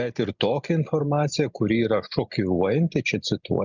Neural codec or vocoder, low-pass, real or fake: none; 7.2 kHz; real